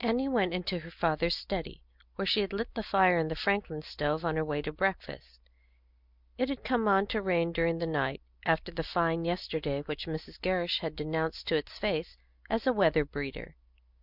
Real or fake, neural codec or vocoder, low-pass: real; none; 5.4 kHz